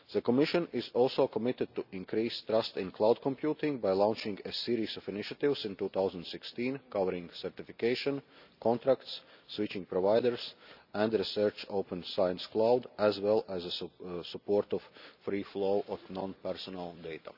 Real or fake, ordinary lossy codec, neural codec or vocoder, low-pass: real; none; none; 5.4 kHz